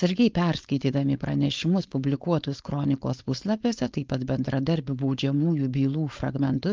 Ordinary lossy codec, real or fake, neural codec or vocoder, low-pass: Opus, 32 kbps; fake; codec, 16 kHz, 4.8 kbps, FACodec; 7.2 kHz